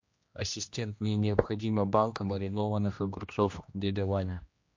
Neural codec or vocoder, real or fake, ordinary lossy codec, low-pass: codec, 16 kHz, 1 kbps, X-Codec, HuBERT features, trained on general audio; fake; MP3, 48 kbps; 7.2 kHz